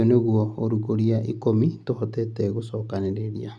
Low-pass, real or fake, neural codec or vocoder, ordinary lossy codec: none; real; none; none